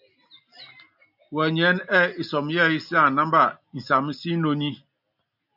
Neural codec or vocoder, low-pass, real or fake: none; 5.4 kHz; real